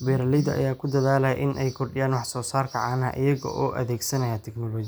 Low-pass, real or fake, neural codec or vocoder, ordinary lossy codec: none; real; none; none